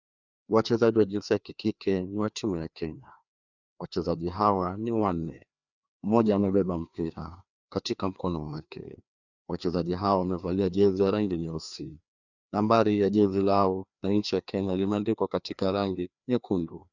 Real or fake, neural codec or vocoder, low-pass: fake; codec, 16 kHz, 2 kbps, FreqCodec, larger model; 7.2 kHz